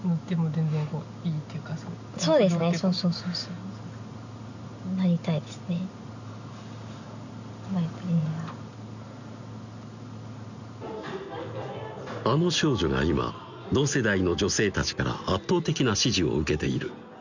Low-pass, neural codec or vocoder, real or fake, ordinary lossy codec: 7.2 kHz; none; real; none